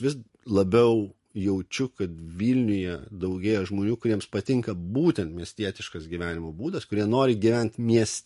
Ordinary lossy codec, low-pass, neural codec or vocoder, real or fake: MP3, 48 kbps; 14.4 kHz; none; real